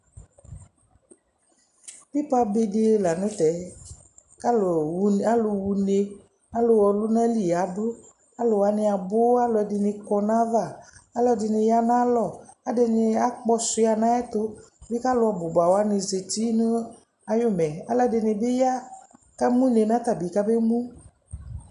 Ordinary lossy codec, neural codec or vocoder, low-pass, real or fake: MP3, 96 kbps; none; 10.8 kHz; real